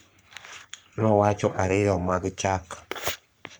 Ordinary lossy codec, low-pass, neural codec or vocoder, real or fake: none; none; codec, 44.1 kHz, 3.4 kbps, Pupu-Codec; fake